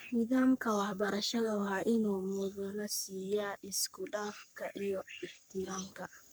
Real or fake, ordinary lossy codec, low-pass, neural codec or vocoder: fake; none; none; codec, 44.1 kHz, 3.4 kbps, Pupu-Codec